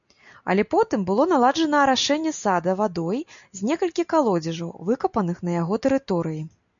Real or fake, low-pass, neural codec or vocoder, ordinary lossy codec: real; 7.2 kHz; none; MP3, 96 kbps